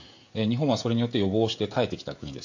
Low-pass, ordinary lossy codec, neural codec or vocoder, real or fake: 7.2 kHz; AAC, 48 kbps; codec, 16 kHz, 16 kbps, FreqCodec, smaller model; fake